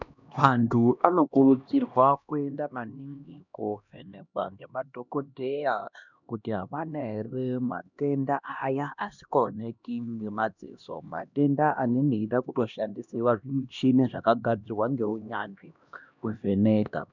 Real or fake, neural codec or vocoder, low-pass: fake; codec, 16 kHz, 1 kbps, X-Codec, HuBERT features, trained on LibriSpeech; 7.2 kHz